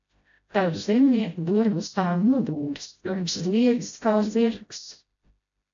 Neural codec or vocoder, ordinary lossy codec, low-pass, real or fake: codec, 16 kHz, 0.5 kbps, FreqCodec, smaller model; AAC, 64 kbps; 7.2 kHz; fake